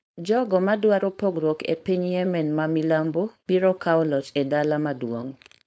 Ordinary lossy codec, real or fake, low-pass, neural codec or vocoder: none; fake; none; codec, 16 kHz, 4.8 kbps, FACodec